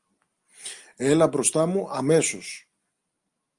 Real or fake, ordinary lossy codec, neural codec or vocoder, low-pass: real; Opus, 32 kbps; none; 10.8 kHz